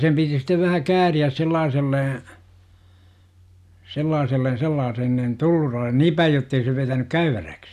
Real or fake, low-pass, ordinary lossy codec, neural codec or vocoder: real; 14.4 kHz; none; none